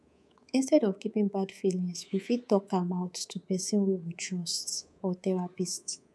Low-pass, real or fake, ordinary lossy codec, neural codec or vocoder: none; fake; none; codec, 24 kHz, 3.1 kbps, DualCodec